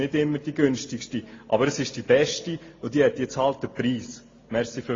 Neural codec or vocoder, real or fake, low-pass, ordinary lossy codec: none; real; 7.2 kHz; AAC, 32 kbps